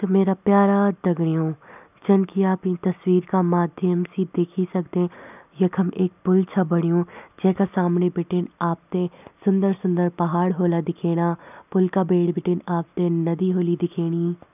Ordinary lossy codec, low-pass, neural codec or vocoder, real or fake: none; 3.6 kHz; none; real